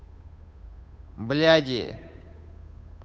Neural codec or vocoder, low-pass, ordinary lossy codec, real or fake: codec, 16 kHz, 8 kbps, FunCodec, trained on Chinese and English, 25 frames a second; none; none; fake